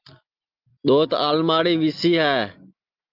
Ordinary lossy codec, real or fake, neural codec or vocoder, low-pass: Opus, 32 kbps; real; none; 5.4 kHz